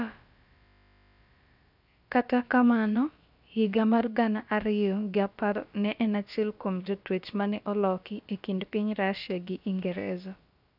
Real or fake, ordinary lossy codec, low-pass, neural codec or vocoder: fake; none; 5.4 kHz; codec, 16 kHz, about 1 kbps, DyCAST, with the encoder's durations